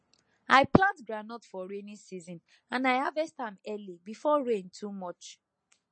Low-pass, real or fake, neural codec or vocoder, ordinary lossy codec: 9.9 kHz; real; none; MP3, 32 kbps